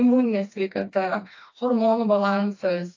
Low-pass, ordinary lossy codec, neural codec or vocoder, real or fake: 7.2 kHz; AAC, 48 kbps; codec, 16 kHz, 2 kbps, FreqCodec, smaller model; fake